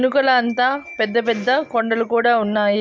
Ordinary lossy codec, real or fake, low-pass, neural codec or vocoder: none; real; none; none